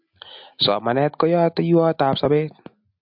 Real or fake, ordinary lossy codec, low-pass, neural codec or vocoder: real; MP3, 48 kbps; 5.4 kHz; none